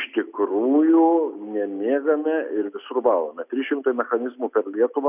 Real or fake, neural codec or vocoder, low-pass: real; none; 3.6 kHz